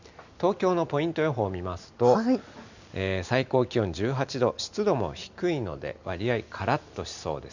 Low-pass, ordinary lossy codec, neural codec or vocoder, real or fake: 7.2 kHz; none; none; real